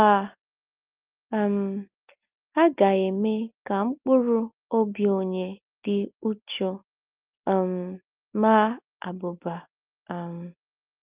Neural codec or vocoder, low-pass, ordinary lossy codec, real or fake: none; 3.6 kHz; Opus, 32 kbps; real